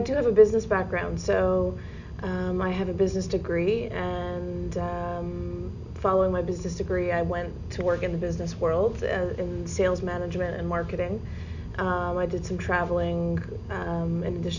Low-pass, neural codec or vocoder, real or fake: 7.2 kHz; none; real